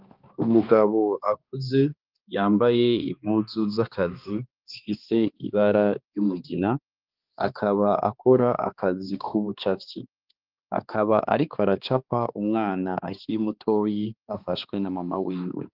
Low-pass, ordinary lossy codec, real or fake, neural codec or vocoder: 5.4 kHz; Opus, 32 kbps; fake; codec, 16 kHz, 2 kbps, X-Codec, HuBERT features, trained on balanced general audio